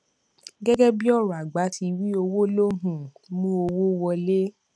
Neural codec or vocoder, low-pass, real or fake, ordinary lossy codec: none; none; real; none